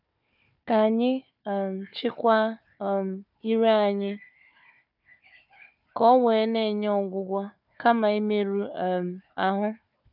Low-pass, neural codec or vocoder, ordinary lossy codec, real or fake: 5.4 kHz; codec, 16 kHz, 4 kbps, FunCodec, trained on Chinese and English, 50 frames a second; none; fake